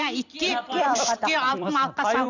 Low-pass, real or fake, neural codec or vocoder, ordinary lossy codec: 7.2 kHz; fake; vocoder, 44.1 kHz, 128 mel bands every 256 samples, BigVGAN v2; none